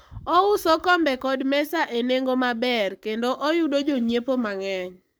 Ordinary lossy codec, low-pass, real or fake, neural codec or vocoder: none; none; fake; codec, 44.1 kHz, 7.8 kbps, Pupu-Codec